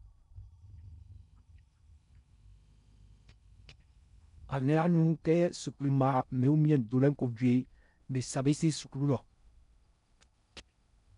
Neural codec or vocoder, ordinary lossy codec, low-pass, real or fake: codec, 16 kHz in and 24 kHz out, 0.6 kbps, FocalCodec, streaming, 4096 codes; none; 10.8 kHz; fake